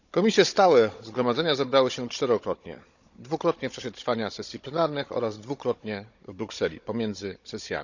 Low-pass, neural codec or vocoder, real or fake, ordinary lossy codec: 7.2 kHz; codec, 16 kHz, 16 kbps, FunCodec, trained on Chinese and English, 50 frames a second; fake; none